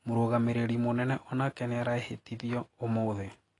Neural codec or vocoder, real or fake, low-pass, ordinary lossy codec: none; real; 10.8 kHz; AAC, 32 kbps